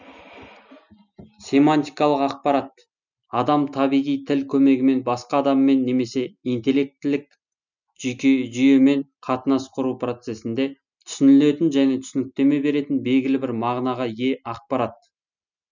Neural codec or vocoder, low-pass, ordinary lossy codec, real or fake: none; 7.2 kHz; none; real